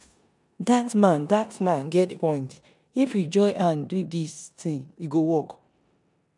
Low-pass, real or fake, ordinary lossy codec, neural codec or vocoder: 10.8 kHz; fake; none; codec, 16 kHz in and 24 kHz out, 0.9 kbps, LongCat-Audio-Codec, four codebook decoder